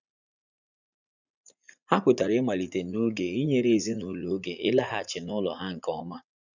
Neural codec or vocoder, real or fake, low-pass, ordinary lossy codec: vocoder, 44.1 kHz, 80 mel bands, Vocos; fake; 7.2 kHz; none